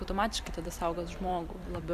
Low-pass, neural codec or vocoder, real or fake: 14.4 kHz; vocoder, 44.1 kHz, 128 mel bands every 256 samples, BigVGAN v2; fake